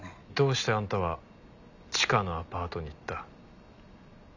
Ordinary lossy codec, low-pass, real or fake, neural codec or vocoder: none; 7.2 kHz; real; none